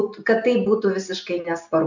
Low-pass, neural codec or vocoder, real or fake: 7.2 kHz; none; real